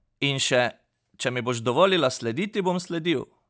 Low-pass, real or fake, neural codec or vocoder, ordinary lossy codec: none; real; none; none